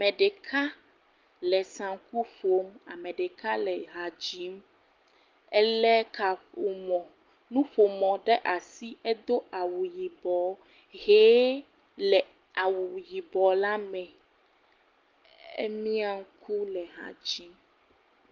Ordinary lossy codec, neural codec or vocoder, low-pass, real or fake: Opus, 24 kbps; none; 7.2 kHz; real